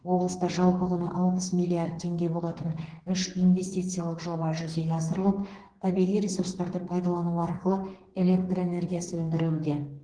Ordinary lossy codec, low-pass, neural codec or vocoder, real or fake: Opus, 16 kbps; 9.9 kHz; codec, 32 kHz, 1.9 kbps, SNAC; fake